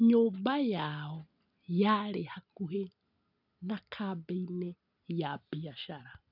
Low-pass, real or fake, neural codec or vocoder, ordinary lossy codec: 5.4 kHz; real; none; none